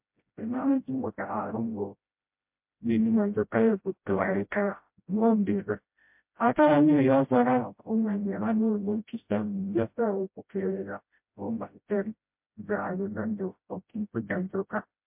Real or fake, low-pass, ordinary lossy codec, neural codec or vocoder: fake; 3.6 kHz; MP3, 32 kbps; codec, 16 kHz, 0.5 kbps, FreqCodec, smaller model